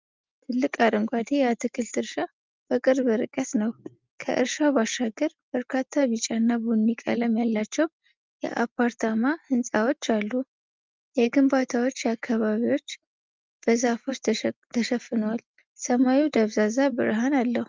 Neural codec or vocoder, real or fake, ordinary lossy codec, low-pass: none; real; Opus, 24 kbps; 7.2 kHz